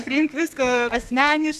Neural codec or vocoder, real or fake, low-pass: codec, 32 kHz, 1.9 kbps, SNAC; fake; 14.4 kHz